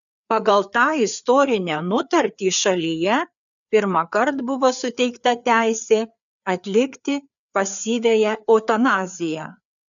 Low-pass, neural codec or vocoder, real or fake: 7.2 kHz; codec, 16 kHz, 4 kbps, FreqCodec, larger model; fake